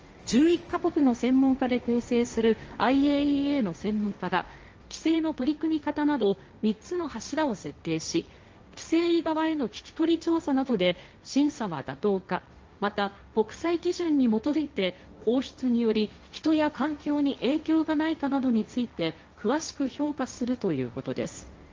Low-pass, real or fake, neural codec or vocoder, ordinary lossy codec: 7.2 kHz; fake; codec, 16 kHz, 1.1 kbps, Voila-Tokenizer; Opus, 24 kbps